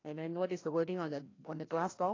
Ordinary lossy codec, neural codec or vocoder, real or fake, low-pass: AAC, 32 kbps; codec, 16 kHz, 1 kbps, FreqCodec, larger model; fake; 7.2 kHz